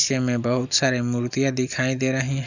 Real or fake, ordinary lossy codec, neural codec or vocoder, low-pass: real; none; none; 7.2 kHz